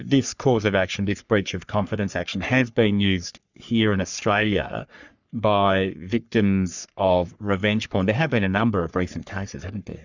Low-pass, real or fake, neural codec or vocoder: 7.2 kHz; fake; codec, 44.1 kHz, 3.4 kbps, Pupu-Codec